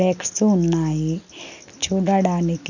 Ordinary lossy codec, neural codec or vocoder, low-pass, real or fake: none; none; 7.2 kHz; real